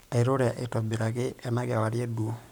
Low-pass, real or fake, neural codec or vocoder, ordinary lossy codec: none; fake; codec, 44.1 kHz, 7.8 kbps, Pupu-Codec; none